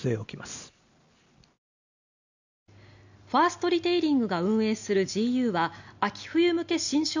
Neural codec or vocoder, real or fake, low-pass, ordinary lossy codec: none; real; 7.2 kHz; none